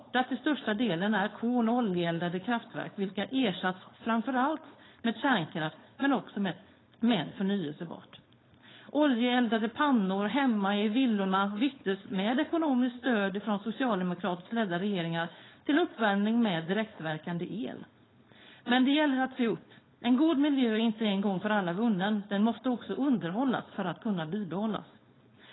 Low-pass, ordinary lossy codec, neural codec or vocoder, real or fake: 7.2 kHz; AAC, 16 kbps; codec, 16 kHz, 4.8 kbps, FACodec; fake